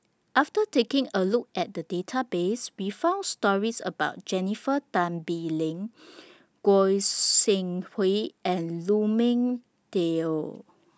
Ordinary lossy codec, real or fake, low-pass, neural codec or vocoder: none; real; none; none